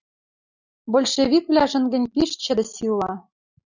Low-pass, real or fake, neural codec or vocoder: 7.2 kHz; real; none